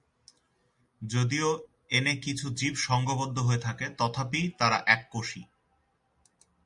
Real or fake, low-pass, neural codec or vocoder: real; 10.8 kHz; none